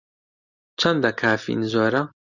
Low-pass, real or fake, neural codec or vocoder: 7.2 kHz; real; none